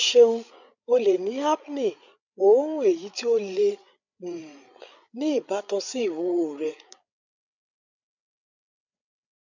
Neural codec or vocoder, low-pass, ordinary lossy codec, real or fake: codec, 16 kHz, 8 kbps, FreqCodec, larger model; 7.2 kHz; none; fake